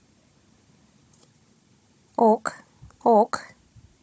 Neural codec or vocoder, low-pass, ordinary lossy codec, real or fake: codec, 16 kHz, 16 kbps, FunCodec, trained on Chinese and English, 50 frames a second; none; none; fake